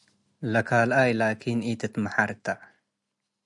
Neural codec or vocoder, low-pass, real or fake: none; 10.8 kHz; real